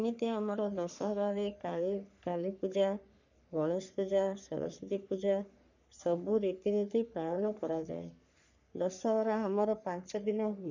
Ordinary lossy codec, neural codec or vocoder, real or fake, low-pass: none; codec, 44.1 kHz, 3.4 kbps, Pupu-Codec; fake; 7.2 kHz